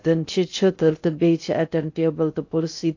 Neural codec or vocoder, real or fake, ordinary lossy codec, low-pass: codec, 16 kHz in and 24 kHz out, 0.6 kbps, FocalCodec, streaming, 2048 codes; fake; AAC, 48 kbps; 7.2 kHz